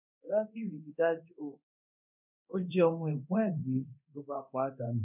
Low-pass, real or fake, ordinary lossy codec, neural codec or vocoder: 3.6 kHz; fake; none; codec, 24 kHz, 0.9 kbps, DualCodec